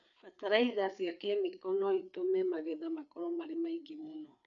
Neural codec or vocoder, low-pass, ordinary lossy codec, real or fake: codec, 16 kHz, 4 kbps, FreqCodec, larger model; 7.2 kHz; none; fake